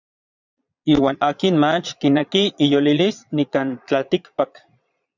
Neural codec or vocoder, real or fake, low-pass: vocoder, 22.05 kHz, 80 mel bands, Vocos; fake; 7.2 kHz